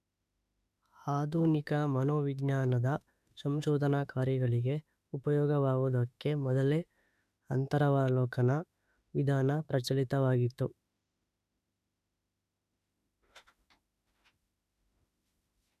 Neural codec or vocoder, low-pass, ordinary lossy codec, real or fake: autoencoder, 48 kHz, 32 numbers a frame, DAC-VAE, trained on Japanese speech; 14.4 kHz; none; fake